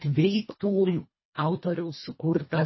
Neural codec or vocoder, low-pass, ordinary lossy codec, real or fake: codec, 24 kHz, 1.5 kbps, HILCodec; 7.2 kHz; MP3, 24 kbps; fake